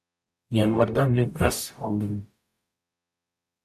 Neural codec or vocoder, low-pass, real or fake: codec, 44.1 kHz, 0.9 kbps, DAC; 14.4 kHz; fake